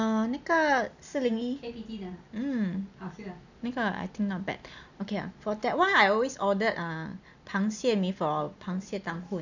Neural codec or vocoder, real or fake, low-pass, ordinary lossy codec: none; real; 7.2 kHz; none